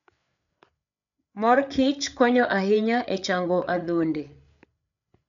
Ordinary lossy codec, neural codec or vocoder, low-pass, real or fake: none; codec, 16 kHz, 8 kbps, FreqCodec, larger model; 7.2 kHz; fake